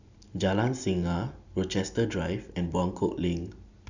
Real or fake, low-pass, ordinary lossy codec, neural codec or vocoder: real; 7.2 kHz; none; none